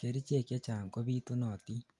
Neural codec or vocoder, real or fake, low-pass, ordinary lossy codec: none; real; 10.8 kHz; Opus, 32 kbps